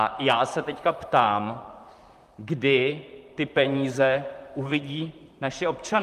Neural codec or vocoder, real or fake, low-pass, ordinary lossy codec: vocoder, 44.1 kHz, 128 mel bands, Pupu-Vocoder; fake; 14.4 kHz; Opus, 32 kbps